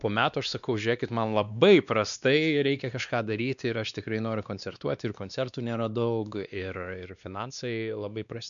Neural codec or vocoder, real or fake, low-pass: codec, 16 kHz, 2 kbps, X-Codec, WavLM features, trained on Multilingual LibriSpeech; fake; 7.2 kHz